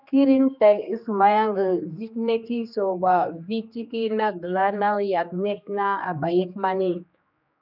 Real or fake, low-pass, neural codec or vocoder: fake; 5.4 kHz; codec, 16 kHz, 2 kbps, X-Codec, HuBERT features, trained on general audio